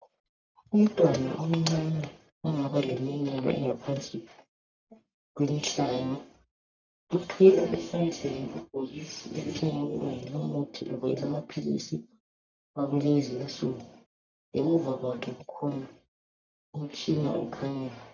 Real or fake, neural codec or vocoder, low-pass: fake; codec, 44.1 kHz, 1.7 kbps, Pupu-Codec; 7.2 kHz